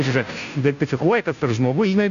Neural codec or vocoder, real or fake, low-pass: codec, 16 kHz, 0.5 kbps, FunCodec, trained on Chinese and English, 25 frames a second; fake; 7.2 kHz